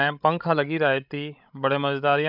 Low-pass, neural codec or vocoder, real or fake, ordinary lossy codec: 5.4 kHz; codec, 16 kHz, 16 kbps, FreqCodec, larger model; fake; none